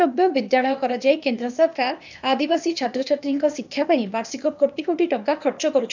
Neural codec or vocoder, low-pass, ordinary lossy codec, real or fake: codec, 16 kHz, 0.8 kbps, ZipCodec; 7.2 kHz; none; fake